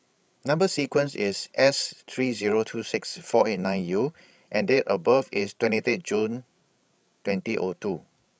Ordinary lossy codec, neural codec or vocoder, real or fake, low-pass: none; codec, 16 kHz, 16 kbps, FreqCodec, larger model; fake; none